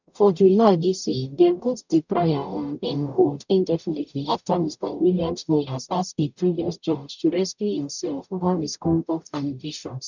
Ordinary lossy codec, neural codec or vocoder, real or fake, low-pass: none; codec, 44.1 kHz, 0.9 kbps, DAC; fake; 7.2 kHz